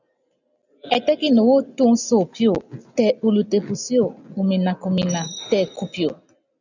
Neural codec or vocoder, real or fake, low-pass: none; real; 7.2 kHz